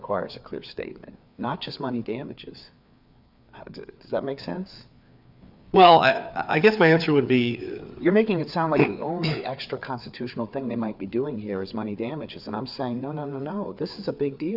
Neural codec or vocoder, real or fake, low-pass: codec, 16 kHz, 4 kbps, FreqCodec, larger model; fake; 5.4 kHz